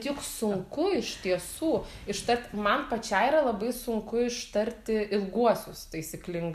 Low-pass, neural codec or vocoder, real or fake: 14.4 kHz; none; real